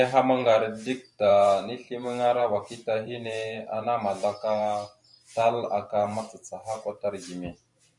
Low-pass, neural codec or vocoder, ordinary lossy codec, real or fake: 10.8 kHz; vocoder, 44.1 kHz, 128 mel bands every 512 samples, BigVGAN v2; MP3, 96 kbps; fake